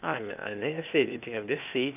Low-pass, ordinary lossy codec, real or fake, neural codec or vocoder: 3.6 kHz; none; fake; codec, 16 kHz, 0.8 kbps, ZipCodec